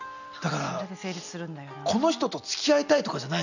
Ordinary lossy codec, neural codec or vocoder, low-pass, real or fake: none; none; 7.2 kHz; real